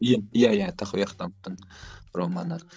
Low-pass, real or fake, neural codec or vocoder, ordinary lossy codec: none; fake; codec, 16 kHz, 16 kbps, FreqCodec, larger model; none